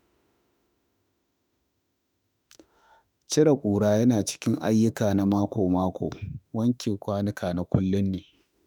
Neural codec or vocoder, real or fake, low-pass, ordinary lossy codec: autoencoder, 48 kHz, 32 numbers a frame, DAC-VAE, trained on Japanese speech; fake; none; none